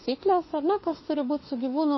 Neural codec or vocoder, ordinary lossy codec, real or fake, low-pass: autoencoder, 48 kHz, 32 numbers a frame, DAC-VAE, trained on Japanese speech; MP3, 24 kbps; fake; 7.2 kHz